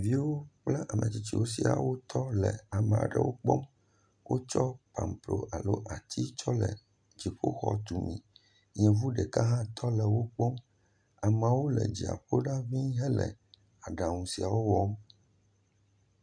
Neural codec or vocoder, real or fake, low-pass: none; real; 9.9 kHz